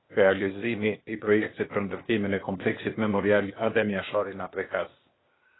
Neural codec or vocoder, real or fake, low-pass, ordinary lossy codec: codec, 16 kHz, 0.8 kbps, ZipCodec; fake; 7.2 kHz; AAC, 16 kbps